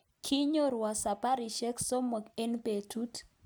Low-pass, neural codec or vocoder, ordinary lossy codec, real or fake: none; none; none; real